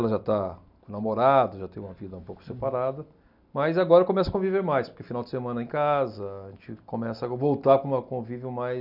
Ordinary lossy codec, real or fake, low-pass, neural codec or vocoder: none; real; 5.4 kHz; none